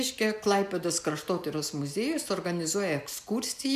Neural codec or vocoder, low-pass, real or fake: none; 14.4 kHz; real